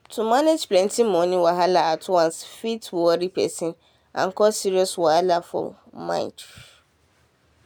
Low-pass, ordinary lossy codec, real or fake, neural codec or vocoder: none; none; real; none